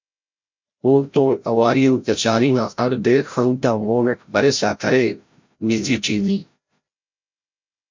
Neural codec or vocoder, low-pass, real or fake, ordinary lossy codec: codec, 16 kHz, 0.5 kbps, FreqCodec, larger model; 7.2 kHz; fake; MP3, 64 kbps